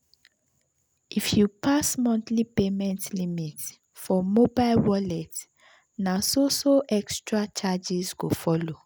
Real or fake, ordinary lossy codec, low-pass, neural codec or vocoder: real; none; none; none